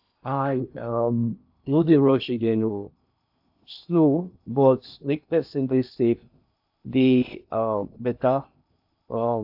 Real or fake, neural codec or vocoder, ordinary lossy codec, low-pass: fake; codec, 16 kHz in and 24 kHz out, 0.8 kbps, FocalCodec, streaming, 65536 codes; AAC, 48 kbps; 5.4 kHz